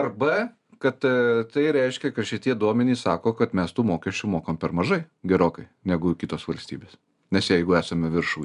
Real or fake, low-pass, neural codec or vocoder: real; 10.8 kHz; none